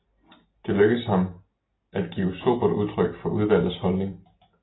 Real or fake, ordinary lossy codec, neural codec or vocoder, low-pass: real; AAC, 16 kbps; none; 7.2 kHz